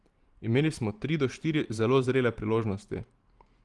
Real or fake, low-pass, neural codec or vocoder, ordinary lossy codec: fake; 10.8 kHz; vocoder, 44.1 kHz, 128 mel bands every 512 samples, BigVGAN v2; Opus, 24 kbps